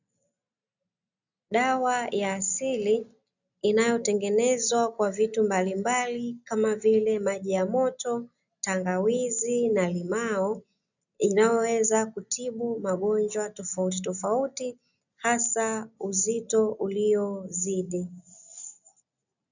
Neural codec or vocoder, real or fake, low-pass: none; real; 7.2 kHz